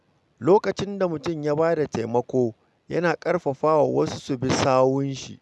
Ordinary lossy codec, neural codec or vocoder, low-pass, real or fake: none; none; none; real